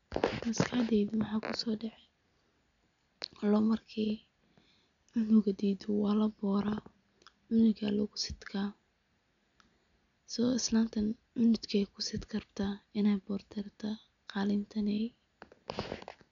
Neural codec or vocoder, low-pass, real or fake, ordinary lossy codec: none; 7.2 kHz; real; none